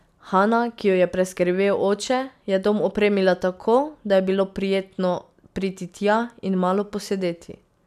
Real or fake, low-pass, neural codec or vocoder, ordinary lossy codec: real; 14.4 kHz; none; none